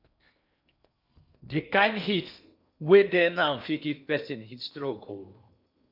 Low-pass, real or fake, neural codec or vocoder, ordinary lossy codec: 5.4 kHz; fake; codec, 16 kHz in and 24 kHz out, 0.8 kbps, FocalCodec, streaming, 65536 codes; none